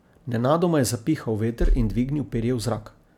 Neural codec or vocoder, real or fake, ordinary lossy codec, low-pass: none; real; none; 19.8 kHz